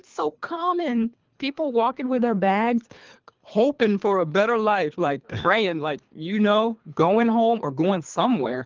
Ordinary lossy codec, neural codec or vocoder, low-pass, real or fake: Opus, 32 kbps; codec, 24 kHz, 3 kbps, HILCodec; 7.2 kHz; fake